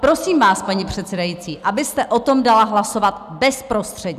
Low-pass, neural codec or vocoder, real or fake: 14.4 kHz; none; real